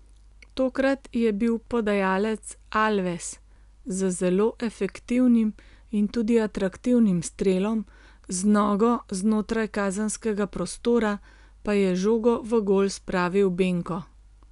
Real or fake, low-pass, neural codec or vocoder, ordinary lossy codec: real; 10.8 kHz; none; none